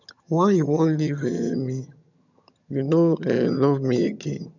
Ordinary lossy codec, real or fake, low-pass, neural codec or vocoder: none; fake; 7.2 kHz; vocoder, 22.05 kHz, 80 mel bands, HiFi-GAN